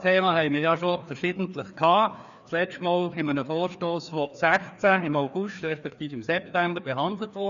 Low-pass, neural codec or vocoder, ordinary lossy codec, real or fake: 7.2 kHz; codec, 16 kHz, 2 kbps, FreqCodec, larger model; none; fake